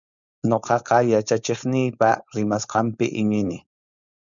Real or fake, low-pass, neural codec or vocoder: fake; 7.2 kHz; codec, 16 kHz, 4.8 kbps, FACodec